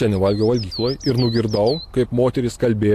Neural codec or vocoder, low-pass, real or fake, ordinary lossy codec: none; 14.4 kHz; real; AAC, 64 kbps